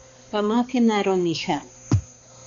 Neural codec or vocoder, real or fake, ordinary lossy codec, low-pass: codec, 16 kHz, 2 kbps, X-Codec, HuBERT features, trained on balanced general audio; fake; AAC, 64 kbps; 7.2 kHz